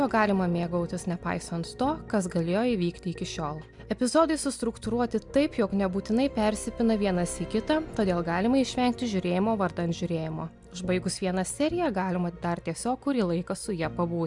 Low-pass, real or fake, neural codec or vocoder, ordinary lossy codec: 10.8 kHz; real; none; MP3, 96 kbps